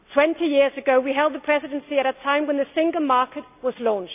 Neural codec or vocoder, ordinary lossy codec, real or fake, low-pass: none; none; real; 3.6 kHz